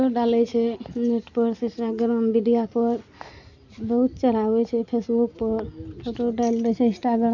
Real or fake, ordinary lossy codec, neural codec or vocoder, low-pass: real; none; none; 7.2 kHz